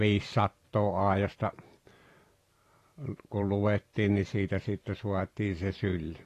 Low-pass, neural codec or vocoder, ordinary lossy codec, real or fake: 14.4 kHz; vocoder, 44.1 kHz, 128 mel bands every 512 samples, BigVGAN v2; AAC, 48 kbps; fake